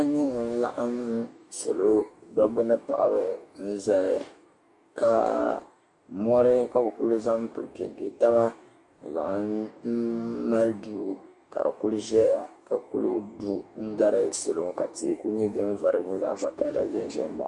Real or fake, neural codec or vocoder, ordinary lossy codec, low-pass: fake; codec, 44.1 kHz, 2.6 kbps, DAC; Opus, 64 kbps; 10.8 kHz